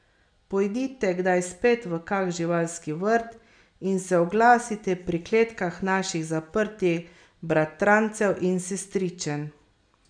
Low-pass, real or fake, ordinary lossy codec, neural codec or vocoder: 9.9 kHz; real; none; none